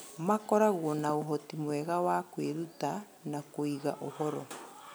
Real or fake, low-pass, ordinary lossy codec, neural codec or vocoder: real; none; none; none